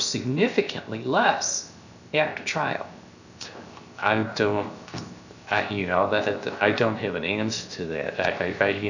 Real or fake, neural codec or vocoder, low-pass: fake; codec, 16 kHz, 0.7 kbps, FocalCodec; 7.2 kHz